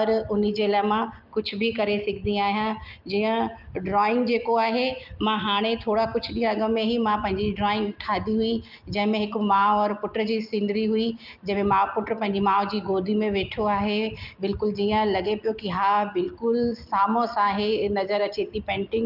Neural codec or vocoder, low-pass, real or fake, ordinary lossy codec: none; 5.4 kHz; real; Opus, 24 kbps